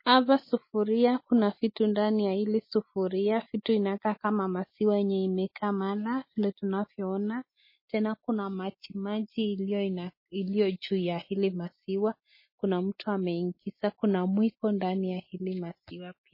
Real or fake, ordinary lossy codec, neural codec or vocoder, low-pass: real; MP3, 24 kbps; none; 5.4 kHz